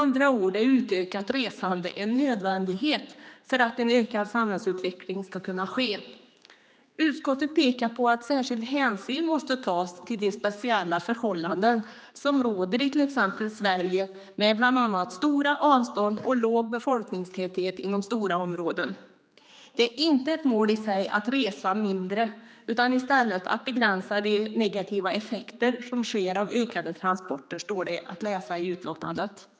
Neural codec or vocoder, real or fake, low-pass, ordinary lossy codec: codec, 16 kHz, 2 kbps, X-Codec, HuBERT features, trained on general audio; fake; none; none